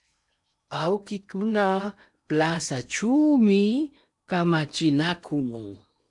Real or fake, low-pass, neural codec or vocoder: fake; 10.8 kHz; codec, 16 kHz in and 24 kHz out, 0.8 kbps, FocalCodec, streaming, 65536 codes